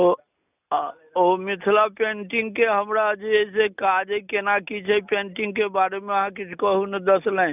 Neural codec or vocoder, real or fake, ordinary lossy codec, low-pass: none; real; none; 3.6 kHz